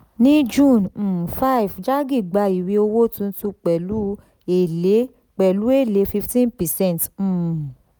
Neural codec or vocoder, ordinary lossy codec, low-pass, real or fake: none; none; none; real